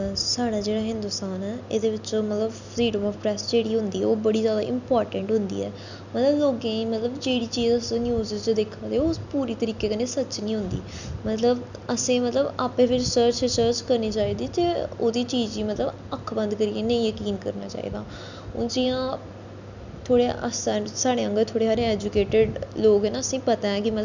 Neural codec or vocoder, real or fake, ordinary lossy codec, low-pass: none; real; none; 7.2 kHz